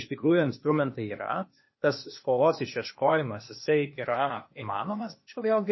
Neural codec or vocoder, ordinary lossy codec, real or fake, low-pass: codec, 16 kHz, 0.8 kbps, ZipCodec; MP3, 24 kbps; fake; 7.2 kHz